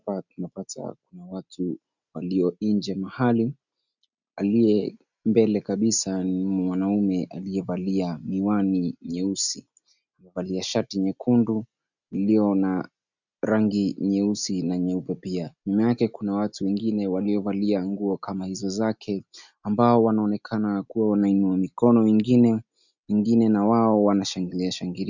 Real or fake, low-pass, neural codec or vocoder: real; 7.2 kHz; none